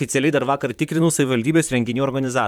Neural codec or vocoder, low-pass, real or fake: vocoder, 44.1 kHz, 128 mel bands, Pupu-Vocoder; 19.8 kHz; fake